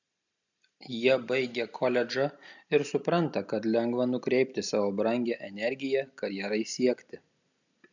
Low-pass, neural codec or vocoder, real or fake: 7.2 kHz; none; real